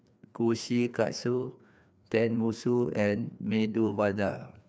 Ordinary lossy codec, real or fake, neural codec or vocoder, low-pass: none; fake; codec, 16 kHz, 2 kbps, FreqCodec, larger model; none